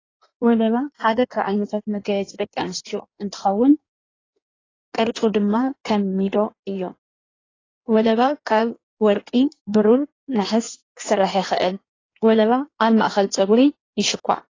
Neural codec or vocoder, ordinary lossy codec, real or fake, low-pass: codec, 16 kHz in and 24 kHz out, 1.1 kbps, FireRedTTS-2 codec; AAC, 32 kbps; fake; 7.2 kHz